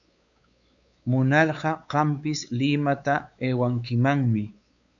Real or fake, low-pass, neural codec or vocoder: fake; 7.2 kHz; codec, 16 kHz, 4 kbps, X-Codec, WavLM features, trained on Multilingual LibriSpeech